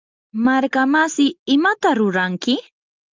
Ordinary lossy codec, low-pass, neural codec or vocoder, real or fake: Opus, 24 kbps; 7.2 kHz; none; real